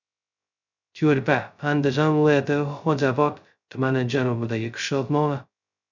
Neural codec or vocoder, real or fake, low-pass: codec, 16 kHz, 0.2 kbps, FocalCodec; fake; 7.2 kHz